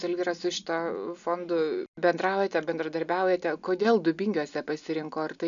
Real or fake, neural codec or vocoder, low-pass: real; none; 7.2 kHz